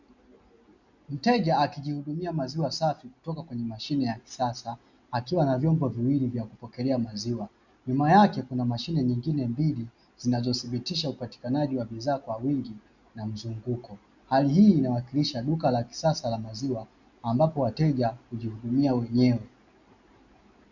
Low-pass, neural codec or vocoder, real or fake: 7.2 kHz; none; real